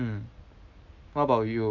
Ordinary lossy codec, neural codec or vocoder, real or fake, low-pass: none; none; real; 7.2 kHz